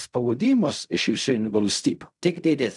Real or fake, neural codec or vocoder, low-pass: fake; codec, 16 kHz in and 24 kHz out, 0.4 kbps, LongCat-Audio-Codec, fine tuned four codebook decoder; 10.8 kHz